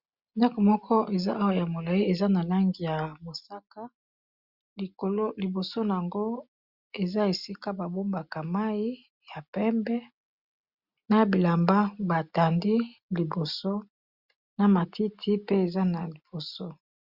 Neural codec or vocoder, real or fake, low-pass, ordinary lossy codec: none; real; 5.4 kHz; Opus, 64 kbps